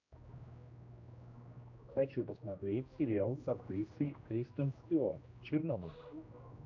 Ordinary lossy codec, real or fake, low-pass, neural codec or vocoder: AAC, 48 kbps; fake; 7.2 kHz; codec, 16 kHz, 1 kbps, X-Codec, HuBERT features, trained on general audio